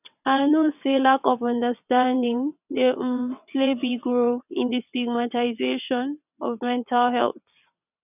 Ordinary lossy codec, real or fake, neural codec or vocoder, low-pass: none; fake; vocoder, 22.05 kHz, 80 mel bands, WaveNeXt; 3.6 kHz